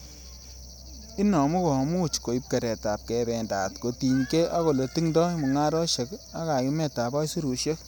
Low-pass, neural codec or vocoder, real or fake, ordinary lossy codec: none; none; real; none